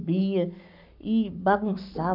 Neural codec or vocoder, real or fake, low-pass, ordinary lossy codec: codec, 44.1 kHz, 7.8 kbps, Pupu-Codec; fake; 5.4 kHz; none